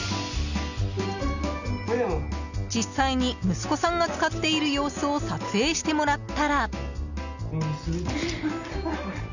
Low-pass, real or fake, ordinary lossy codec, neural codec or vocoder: 7.2 kHz; real; none; none